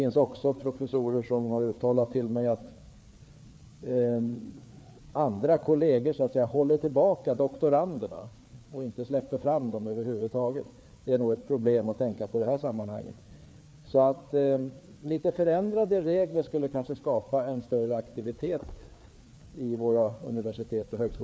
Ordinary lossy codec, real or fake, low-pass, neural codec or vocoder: none; fake; none; codec, 16 kHz, 4 kbps, FreqCodec, larger model